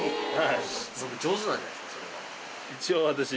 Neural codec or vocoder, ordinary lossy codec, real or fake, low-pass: none; none; real; none